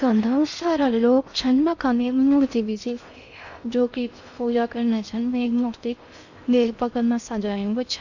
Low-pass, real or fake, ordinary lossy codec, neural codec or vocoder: 7.2 kHz; fake; none; codec, 16 kHz in and 24 kHz out, 0.6 kbps, FocalCodec, streaming, 4096 codes